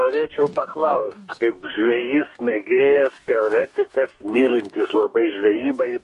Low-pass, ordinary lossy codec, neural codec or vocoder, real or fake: 14.4 kHz; MP3, 48 kbps; codec, 44.1 kHz, 2.6 kbps, DAC; fake